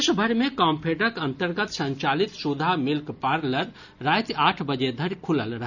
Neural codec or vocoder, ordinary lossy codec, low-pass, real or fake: none; none; 7.2 kHz; real